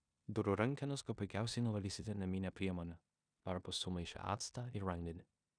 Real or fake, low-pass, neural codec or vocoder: fake; 9.9 kHz; codec, 16 kHz in and 24 kHz out, 0.9 kbps, LongCat-Audio-Codec, four codebook decoder